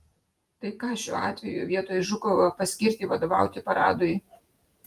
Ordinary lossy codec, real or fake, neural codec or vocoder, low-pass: Opus, 32 kbps; fake; vocoder, 48 kHz, 128 mel bands, Vocos; 14.4 kHz